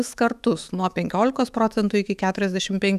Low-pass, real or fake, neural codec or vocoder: 14.4 kHz; fake; autoencoder, 48 kHz, 128 numbers a frame, DAC-VAE, trained on Japanese speech